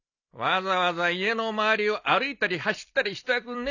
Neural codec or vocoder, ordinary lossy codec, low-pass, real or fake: none; none; 7.2 kHz; real